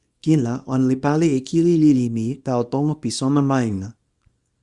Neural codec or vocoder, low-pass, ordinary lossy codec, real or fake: codec, 24 kHz, 0.9 kbps, WavTokenizer, small release; 10.8 kHz; Opus, 64 kbps; fake